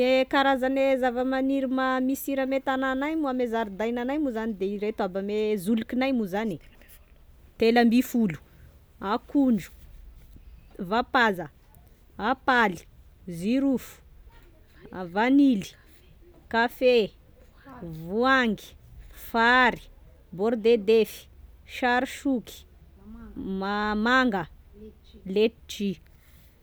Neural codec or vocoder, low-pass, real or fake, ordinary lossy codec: none; none; real; none